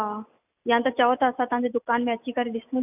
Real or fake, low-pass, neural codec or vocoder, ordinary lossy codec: real; 3.6 kHz; none; none